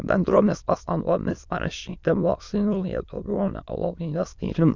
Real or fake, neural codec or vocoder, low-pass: fake; autoencoder, 22.05 kHz, a latent of 192 numbers a frame, VITS, trained on many speakers; 7.2 kHz